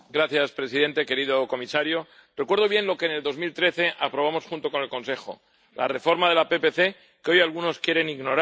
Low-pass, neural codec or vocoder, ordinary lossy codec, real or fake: none; none; none; real